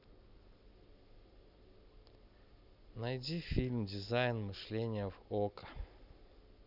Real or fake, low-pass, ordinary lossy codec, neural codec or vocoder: real; 5.4 kHz; none; none